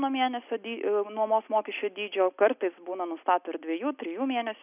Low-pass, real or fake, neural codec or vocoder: 3.6 kHz; real; none